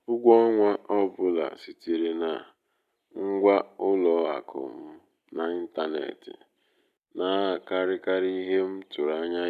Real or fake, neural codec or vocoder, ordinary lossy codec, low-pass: real; none; none; 14.4 kHz